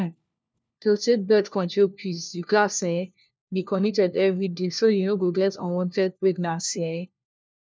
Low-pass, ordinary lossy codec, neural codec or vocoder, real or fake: none; none; codec, 16 kHz, 1 kbps, FunCodec, trained on LibriTTS, 50 frames a second; fake